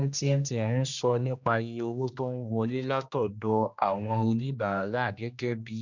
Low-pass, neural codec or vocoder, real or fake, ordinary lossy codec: 7.2 kHz; codec, 16 kHz, 1 kbps, X-Codec, HuBERT features, trained on general audio; fake; none